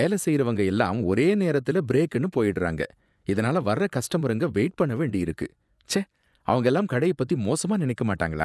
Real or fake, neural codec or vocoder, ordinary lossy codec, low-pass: fake; vocoder, 24 kHz, 100 mel bands, Vocos; none; none